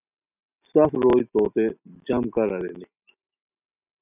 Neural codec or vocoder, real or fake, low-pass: none; real; 3.6 kHz